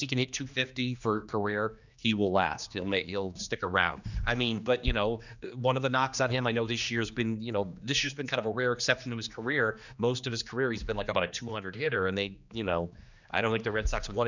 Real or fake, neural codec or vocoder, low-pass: fake; codec, 16 kHz, 2 kbps, X-Codec, HuBERT features, trained on general audio; 7.2 kHz